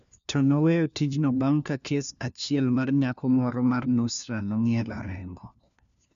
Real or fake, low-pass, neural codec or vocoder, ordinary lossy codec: fake; 7.2 kHz; codec, 16 kHz, 1 kbps, FunCodec, trained on LibriTTS, 50 frames a second; none